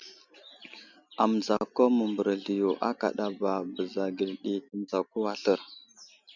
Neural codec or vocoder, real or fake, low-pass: none; real; 7.2 kHz